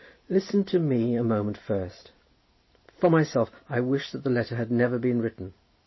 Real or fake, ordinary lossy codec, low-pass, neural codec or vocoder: real; MP3, 24 kbps; 7.2 kHz; none